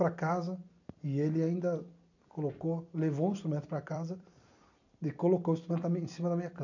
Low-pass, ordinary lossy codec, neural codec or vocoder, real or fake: 7.2 kHz; none; vocoder, 44.1 kHz, 128 mel bands every 256 samples, BigVGAN v2; fake